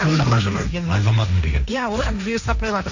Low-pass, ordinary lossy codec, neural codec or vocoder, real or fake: 7.2 kHz; none; codec, 16 kHz, 1.1 kbps, Voila-Tokenizer; fake